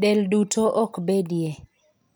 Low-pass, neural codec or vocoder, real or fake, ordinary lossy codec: none; none; real; none